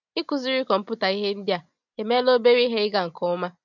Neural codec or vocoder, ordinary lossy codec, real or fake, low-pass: none; none; real; 7.2 kHz